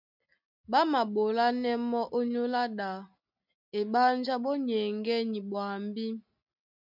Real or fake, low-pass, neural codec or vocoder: real; 5.4 kHz; none